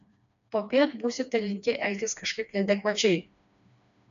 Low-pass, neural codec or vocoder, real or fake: 7.2 kHz; codec, 16 kHz, 2 kbps, FreqCodec, smaller model; fake